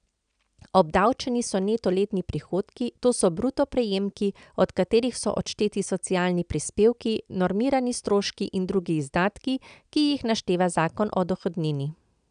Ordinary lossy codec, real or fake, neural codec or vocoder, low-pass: none; real; none; 9.9 kHz